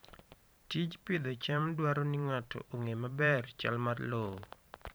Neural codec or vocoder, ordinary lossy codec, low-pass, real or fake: vocoder, 44.1 kHz, 128 mel bands every 512 samples, BigVGAN v2; none; none; fake